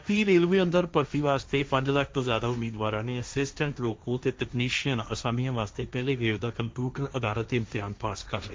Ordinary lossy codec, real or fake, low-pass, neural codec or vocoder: none; fake; none; codec, 16 kHz, 1.1 kbps, Voila-Tokenizer